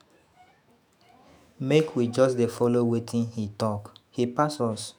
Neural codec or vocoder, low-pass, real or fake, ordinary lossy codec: autoencoder, 48 kHz, 128 numbers a frame, DAC-VAE, trained on Japanese speech; none; fake; none